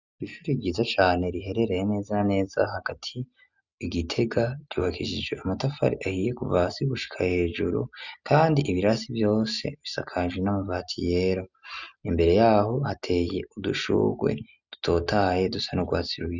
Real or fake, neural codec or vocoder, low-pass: real; none; 7.2 kHz